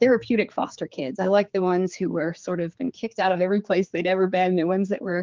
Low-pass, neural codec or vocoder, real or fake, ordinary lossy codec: 7.2 kHz; codec, 16 kHz, 4 kbps, X-Codec, HuBERT features, trained on general audio; fake; Opus, 32 kbps